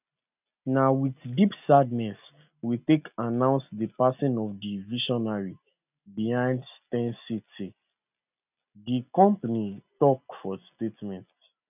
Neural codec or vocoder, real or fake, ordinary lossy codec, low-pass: none; real; none; 3.6 kHz